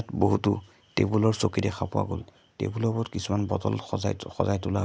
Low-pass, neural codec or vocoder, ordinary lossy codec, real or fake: none; none; none; real